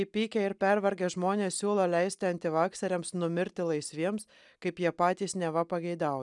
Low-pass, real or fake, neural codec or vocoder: 10.8 kHz; real; none